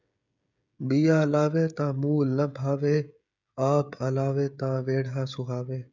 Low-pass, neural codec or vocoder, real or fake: 7.2 kHz; codec, 16 kHz, 16 kbps, FreqCodec, smaller model; fake